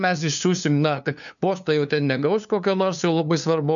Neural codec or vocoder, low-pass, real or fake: codec, 16 kHz, 2 kbps, FunCodec, trained on LibriTTS, 25 frames a second; 7.2 kHz; fake